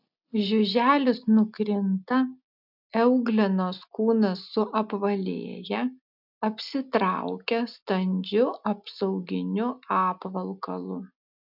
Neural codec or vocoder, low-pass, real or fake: none; 5.4 kHz; real